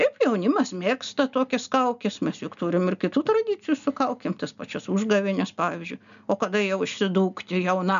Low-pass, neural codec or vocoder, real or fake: 7.2 kHz; none; real